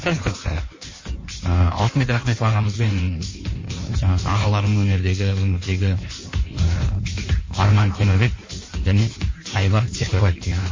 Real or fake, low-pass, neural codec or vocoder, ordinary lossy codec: fake; 7.2 kHz; codec, 16 kHz in and 24 kHz out, 1.1 kbps, FireRedTTS-2 codec; MP3, 32 kbps